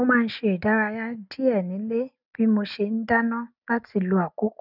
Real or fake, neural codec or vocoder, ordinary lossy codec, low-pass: real; none; none; 5.4 kHz